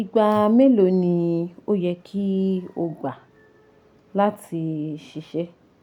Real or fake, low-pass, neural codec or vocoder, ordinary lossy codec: real; 19.8 kHz; none; none